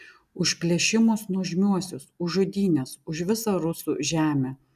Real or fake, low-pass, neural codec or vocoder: real; 14.4 kHz; none